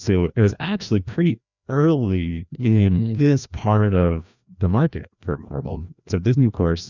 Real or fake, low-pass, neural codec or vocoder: fake; 7.2 kHz; codec, 16 kHz, 1 kbps, FreqCodec, larger model